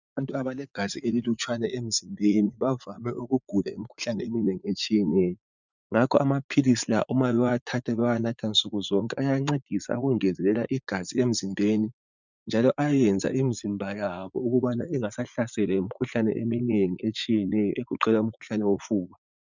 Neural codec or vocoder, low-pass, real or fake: vocoder, 44.1 kHz, 80 mel bands, Vocos; 7.2 kHz; fake